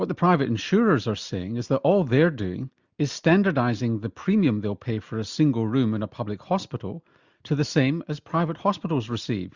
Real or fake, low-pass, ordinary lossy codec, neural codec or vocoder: real; 7.2 kHz; Opus, 64 kbps; none